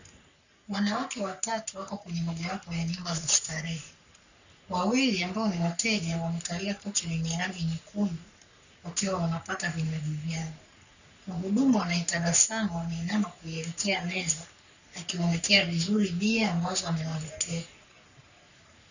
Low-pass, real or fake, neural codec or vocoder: 7.2 kHz; fake; codec, 44.1 kHz, 3.4 kbps, Pupu-Codec